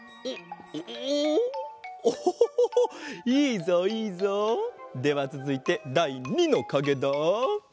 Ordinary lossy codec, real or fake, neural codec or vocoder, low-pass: none; real; none; none